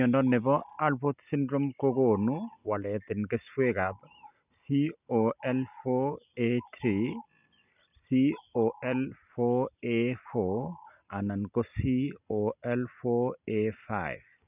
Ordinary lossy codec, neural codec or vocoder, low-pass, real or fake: none; none; 3.6 kHz; real